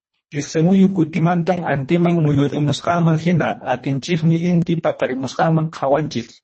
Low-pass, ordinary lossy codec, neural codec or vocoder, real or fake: 10.8 kHz; MP3, 32 kbps; codec, 24 kHz, 1.5 kbps, HILCodec; fake